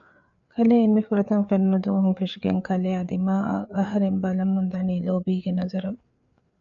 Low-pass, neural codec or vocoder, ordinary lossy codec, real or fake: 7.2 kHz; codec, 16 kHz, 4 kbps, FreqCodec, larger model; MP3, 96 kbps; fake